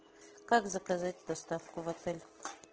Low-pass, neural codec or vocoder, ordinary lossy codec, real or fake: 7.2 kHz; none; Opus, 16 kbps; real